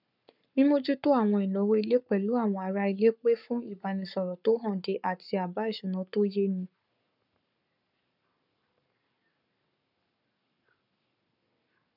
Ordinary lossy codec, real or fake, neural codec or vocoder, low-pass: none; fake; codec, 44.1 kHz, 7.8 kbps, Pupu-Codec; 5.4 kHz